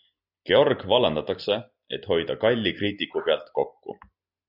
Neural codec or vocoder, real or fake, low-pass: none; real; 5.4 kHz